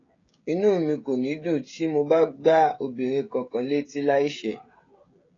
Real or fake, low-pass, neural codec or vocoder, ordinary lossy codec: fake; 7.2 kHz; codec, 16 kHz, 16 kbps, FreqCodec, smaller model; AAC, 32 kbps